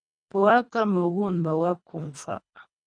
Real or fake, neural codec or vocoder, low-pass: fake; codec, 24 kHz, 1.5 kbps, HILCodec; 9.9 kHz